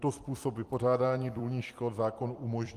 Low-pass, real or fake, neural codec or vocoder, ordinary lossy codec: 14.4 kHz; fake; vocoder, 44.1 kHz, 128 mel bands every 256 samples, BigVGAN v2; Opus, 24 kbps